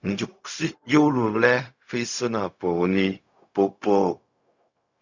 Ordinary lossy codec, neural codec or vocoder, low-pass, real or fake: Opus, 64 kbps; codec, 16 kHz, 0.4 kbps, LongCat-Audio-Codec; 7.2 kHz; fake